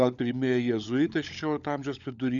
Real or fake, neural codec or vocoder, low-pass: fake; codec, 16 kHz, 16 kbps, FunCodec, trained on LibriTTS, 50 frames a second; 7.2 kHz